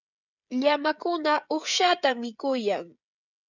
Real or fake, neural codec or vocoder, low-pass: fake; codec, 16 kHz, 16 kbps, FreqCodec, smaller model; 7.2 kHz